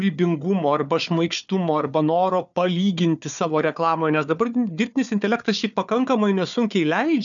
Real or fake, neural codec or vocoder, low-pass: fake; codec, 16 kHz, 4 kbps, FunCodec, trained on Chinese and English, 50 frames a second; 7.2 kHz